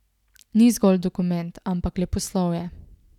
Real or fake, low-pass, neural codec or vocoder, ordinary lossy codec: real; 19.8 kHz; none; none